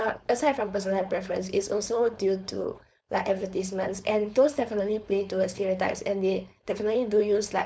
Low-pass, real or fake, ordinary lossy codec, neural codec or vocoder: none; fake; none; codec, 16 kHz, 4.8 kbps, FACodec